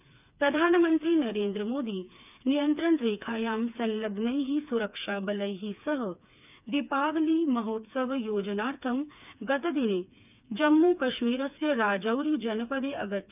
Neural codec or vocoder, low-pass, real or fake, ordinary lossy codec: codec, 16 kHz, 4 kbps, FreqCodec, smaller model; 3.6 kHz; fake; none